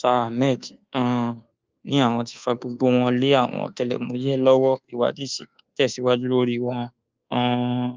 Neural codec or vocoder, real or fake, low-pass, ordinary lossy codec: codec, 24 kHz, 1.2 kbps, DualCodec; fake; 7.2 kHz; Opus, 24 kbps